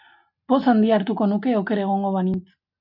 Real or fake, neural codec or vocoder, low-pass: real; none; 5.4 kHz